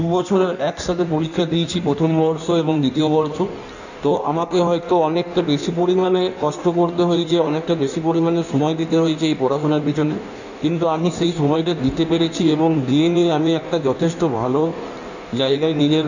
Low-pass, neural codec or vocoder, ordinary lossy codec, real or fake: 7.2 kHz; codec, 16 kHz in and 24 kHz out, 1.1 kbps, FireRedTTS-2 codec; none; fake